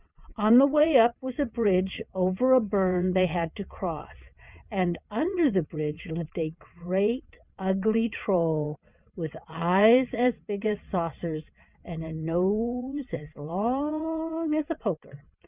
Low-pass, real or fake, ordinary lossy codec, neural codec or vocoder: 3.6 kHz; fake; Opus, 64 kbps; vocoder, 22.05 kHz, 80 mel bands, WaveNeXt